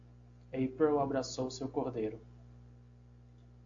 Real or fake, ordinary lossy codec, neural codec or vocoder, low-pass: real; AAC, 48 kbps; none; 7.2 kHz